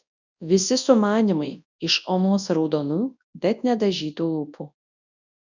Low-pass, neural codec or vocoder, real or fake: 7.2 kHz; codec, 24 kHz, 0.9 kbps, WavTokenizer, large speech release; fake